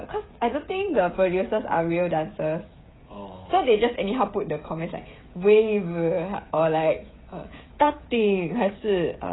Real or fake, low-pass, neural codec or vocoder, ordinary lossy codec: fake; 7.2 kHz; codec, 16 kHz, 16 kbps, FreqCodec, smaller model; AAC, 16 kbps